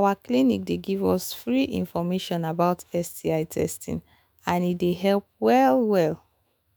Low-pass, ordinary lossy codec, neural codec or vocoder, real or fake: none; none; autoencoder, 48 kHz, 128 numbers a frame, DAC-VAE, trained on Japanese speech; fake